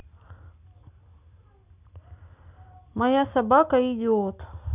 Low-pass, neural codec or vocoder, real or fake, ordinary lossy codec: 3.6 kHz; none; real; none